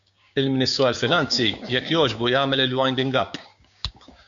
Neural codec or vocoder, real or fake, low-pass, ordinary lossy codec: codec, 16 kHz, 6 kbps, DAC; fake; 7.2 kHz; MP3, 96 kbps